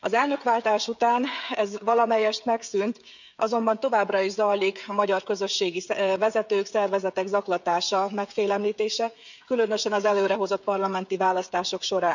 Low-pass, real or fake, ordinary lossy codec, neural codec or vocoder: 7.2 kHz; fake; none; codec, 16 kHz, 16 kbps, FreqCodec, smaller model